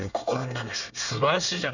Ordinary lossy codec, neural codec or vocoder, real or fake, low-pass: none; codec, 24 kHz, 1 kbps, SNAC; fake; 7.2 kHz